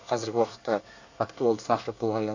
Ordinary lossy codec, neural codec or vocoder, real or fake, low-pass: AAC, 48 kbps; codec, 24 kHz, 1 kbps, SNAC; fake; 7.2 kHz